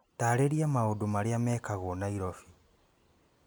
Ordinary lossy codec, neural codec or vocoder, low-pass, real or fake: none; none; none; real